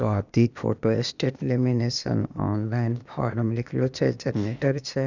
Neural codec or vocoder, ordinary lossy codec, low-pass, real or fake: codec, 16 kHz, 0.8 kbps, ZipCodec; none; 7.2 kHz; fake